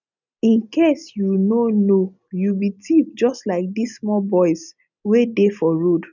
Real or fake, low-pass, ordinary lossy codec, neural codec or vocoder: real; 7.2 kHz; none; none